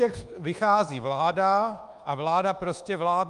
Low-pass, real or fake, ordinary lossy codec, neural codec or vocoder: 10.8 kHz; fake; Opus, 32 kbps; codec, 24 kHz, 1.2 kbps, DualCodec